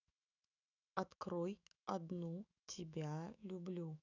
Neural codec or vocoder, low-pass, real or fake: autoencoder, 48 kHz, 128 numbers a frame, DAC-VAE, trained on Japanese speech; 7.2 kHz; fake